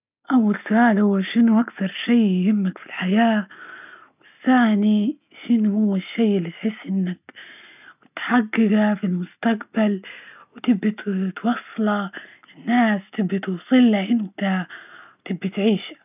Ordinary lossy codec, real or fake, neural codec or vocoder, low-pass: none; real; none; 3.6 kHz